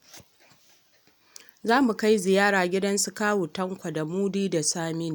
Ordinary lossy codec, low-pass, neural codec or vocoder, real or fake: none; none; none; real